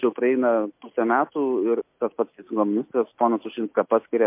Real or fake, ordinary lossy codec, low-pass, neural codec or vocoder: real; MP3, 32 kbps; 3.6 kHz; none